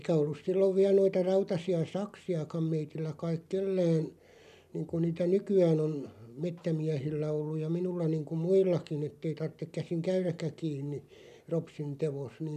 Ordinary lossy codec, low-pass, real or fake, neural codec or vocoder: none; 14.4 kHz; real; none